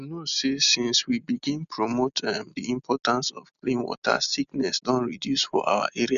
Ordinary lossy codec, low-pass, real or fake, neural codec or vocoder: none; 7.2 kHz; real; none